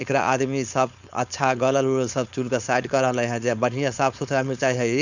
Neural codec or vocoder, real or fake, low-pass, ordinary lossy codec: codec, 16 kHz, 4.8 kbps, FACodec; fake; 7.2 kHz; none